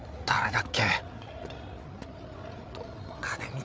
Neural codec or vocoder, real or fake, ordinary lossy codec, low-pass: codec, 16 kHz, 8 kbps, FreqCodec, larger model; fake; none; none